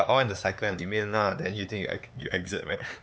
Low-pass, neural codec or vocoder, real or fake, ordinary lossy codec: none; codec, 16 kHz, 4 kbps, X-Codec, HuBERT features, trained on LibriSpeech; fake; none